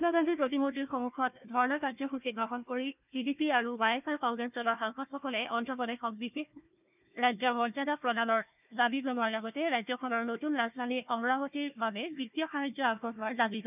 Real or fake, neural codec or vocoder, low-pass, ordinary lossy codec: fake; codec, 16 kHz, 1 kbps, FunCodec, trained on Chinese and English, 50 frames a second; 3.6 kHz; none